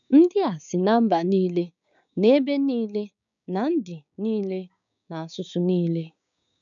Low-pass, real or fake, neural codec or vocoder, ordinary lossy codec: 7.2 kHz; fake; codec, 16 kHz, 6 kbps, DAC; none